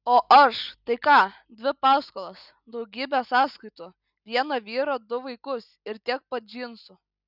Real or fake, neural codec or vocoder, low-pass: real; none; 5.4 kHz